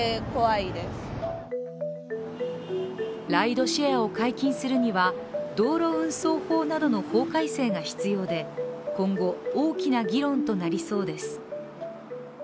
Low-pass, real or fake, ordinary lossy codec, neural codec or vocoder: none; real; none; none